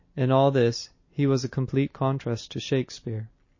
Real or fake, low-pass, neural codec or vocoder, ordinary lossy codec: real; 7.2 kHz; none; MP3, 32 kbps